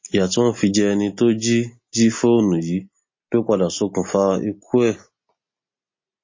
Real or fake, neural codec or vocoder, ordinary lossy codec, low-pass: real; none; MP3, 32 kbps; 7.2 kHz